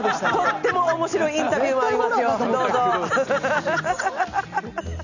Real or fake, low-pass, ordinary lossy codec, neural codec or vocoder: real; 7.2 kHz; none; none